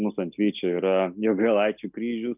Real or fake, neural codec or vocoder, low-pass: real; none; 3.6 kHz